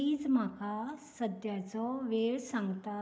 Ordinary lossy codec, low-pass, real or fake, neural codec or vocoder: none; none; real; none